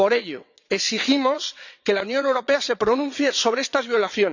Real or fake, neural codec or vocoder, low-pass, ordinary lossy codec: fake; vocoder, 22.05 kHz, 80 mel bands, WaveNeXt; 7.2 kHz; none